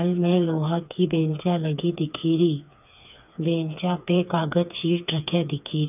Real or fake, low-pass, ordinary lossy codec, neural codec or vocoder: fake; 3.6 kHz; none; codec, 16 kHz, 4 kbps, FreqCodec, smaller model